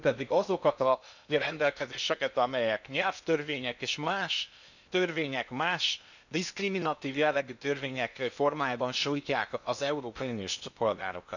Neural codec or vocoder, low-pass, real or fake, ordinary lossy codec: codec, 16 kHz in and 24 kHz out, 0.8 kbps, FocalCodec, streaming, 65536 codes; 7.2 kHz; fake; none